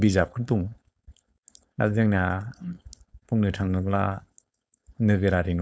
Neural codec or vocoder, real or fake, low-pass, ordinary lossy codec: codec, 16 kHz, 4.8 kbps, FACodec; fake; none; none